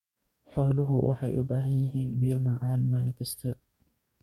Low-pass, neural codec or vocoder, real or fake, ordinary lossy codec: 19.8 kHz; codec, 44.1 kHz, 2.6 kbps, DAC; fake; MP3, 64 kbps